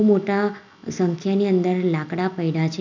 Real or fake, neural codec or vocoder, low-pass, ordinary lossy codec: real; none; 7.2 kHz; none